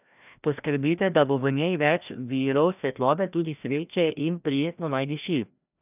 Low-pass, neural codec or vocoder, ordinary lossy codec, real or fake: 3.6 kHz; codec, 16 kHz, 1 kbps, FreqCodec, larger model; none; fake